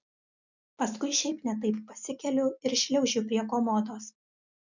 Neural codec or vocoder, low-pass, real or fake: none; 7.2 kHz; real